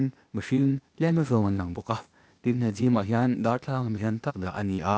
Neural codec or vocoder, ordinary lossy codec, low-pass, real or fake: codec, 16 kHz, 0.8 kbps, ZipCodec; none; none; fake